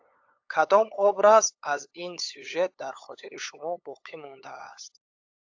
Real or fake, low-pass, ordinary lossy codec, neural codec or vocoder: fake; 7.2 kHz; AAC, 48 kbps; codec, 16 kHz, 8 kbps, FunCodec, trained on LibriTTS, 25 frames a second